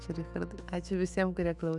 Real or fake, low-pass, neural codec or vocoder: fake; 10.8 kHz; autoencoder, 48 kHz, 128 numbers a frame, DAC-VAE, trained on Japanese speech